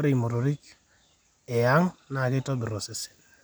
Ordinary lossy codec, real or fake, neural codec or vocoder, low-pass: none; real; none; none